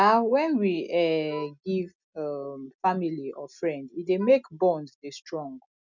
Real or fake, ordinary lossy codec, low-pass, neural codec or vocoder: real; none; 7.2 kHz; none